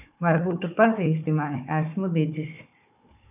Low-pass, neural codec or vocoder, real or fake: 3.6 kHz; codec, 16 kHz, 4 kbps, FunCodec, trained on Chinese and English, 50 frames a second; fake